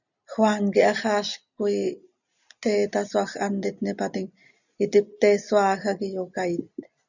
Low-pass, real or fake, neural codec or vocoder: 7.2 kHz; real; none